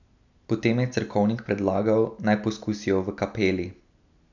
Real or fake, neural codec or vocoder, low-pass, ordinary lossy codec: real; none; 7.2 kHz; none